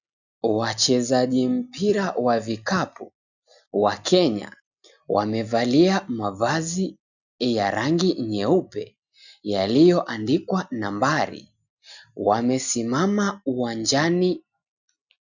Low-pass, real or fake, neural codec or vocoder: 7.2 kHz; real; none